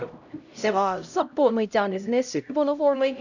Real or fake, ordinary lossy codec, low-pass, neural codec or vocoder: fake; none; 7.2 kHz; codec, 16 kHz, 0.5 kbps, X-Codec, HuBERT features, trained on LibriSpeech